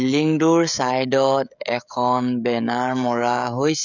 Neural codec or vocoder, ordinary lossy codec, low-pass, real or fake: codec, 16 kHz, 16 kbps, FreqCodec, smaller model; none; 7.2 kHz; fake